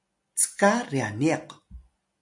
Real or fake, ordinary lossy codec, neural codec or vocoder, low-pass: real; MP3, 64 kbps; none; 10.8 kHz